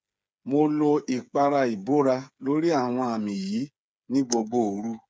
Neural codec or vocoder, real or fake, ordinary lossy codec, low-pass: codec, 16 kHz, 8 kbps, FreqCodec, smaller model; fake; none; none